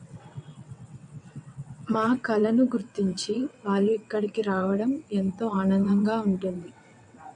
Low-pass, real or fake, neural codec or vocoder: 9.9 kHz; fake; vocoder, 22.05 kHz, 80 mel bands, WaveNeXt